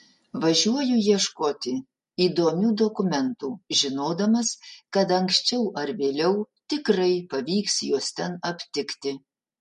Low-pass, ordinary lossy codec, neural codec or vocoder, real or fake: 10.8 kHz; MP3, 48 kbps; none; real